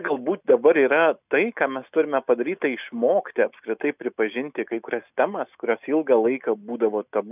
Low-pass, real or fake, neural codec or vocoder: 3.6 kHz; real; none